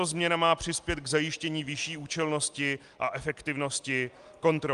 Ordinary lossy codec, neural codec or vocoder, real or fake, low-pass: Opus, 32 kbps; none; real; 10.8 kHz